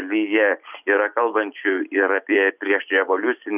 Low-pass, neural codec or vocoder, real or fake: 3.6 kHz; vocoder, 44.1 kHz, 128 mel bands every 256 samples, BigVGAN v2; fake